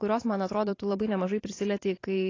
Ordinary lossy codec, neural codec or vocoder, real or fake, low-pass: AAC, 32 kbps; vocoder, 44.1 kHz, 128 mel bands every 512 samples, BigVGAN v2; fake; 7.2 kHz